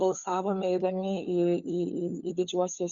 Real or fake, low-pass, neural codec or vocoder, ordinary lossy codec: fake; 7.2 kHz; codec, 16 kHz, 4 kbps, FunCodec, trained on LibriTTS, 50 frames a second; Opus, 64 kbps